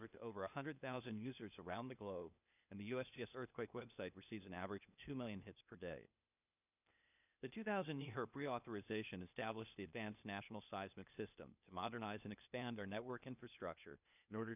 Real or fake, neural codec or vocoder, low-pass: fake; codec, 16 kHz, 0.8 kbps, ZipCodec; 3.6 kHz